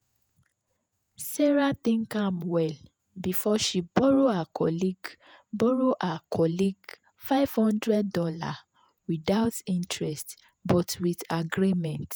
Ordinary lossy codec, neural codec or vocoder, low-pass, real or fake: none; vocoder, 48 kHz, 128 mel bands, Vocos; none; fake